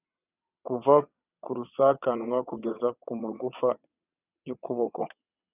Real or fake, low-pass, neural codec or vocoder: fake; 3.6 kHz; vocoder, 22.05 kHz, 80 mel bands, WaveNeXt